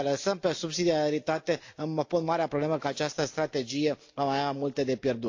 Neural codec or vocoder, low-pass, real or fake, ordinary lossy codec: none; 7.2 kHz; real; AAC, 48 kbps